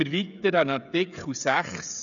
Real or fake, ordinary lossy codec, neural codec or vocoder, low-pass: fake; none; codec, 16 kHz, 16 kbps, FreqCodec, smaller model; 7.2 kHz